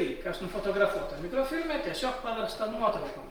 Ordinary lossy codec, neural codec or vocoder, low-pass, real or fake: Opus, 24 kbps; none; 19.8 kHz; real